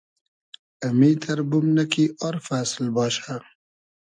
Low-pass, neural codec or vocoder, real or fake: 9.9 kHz; none; real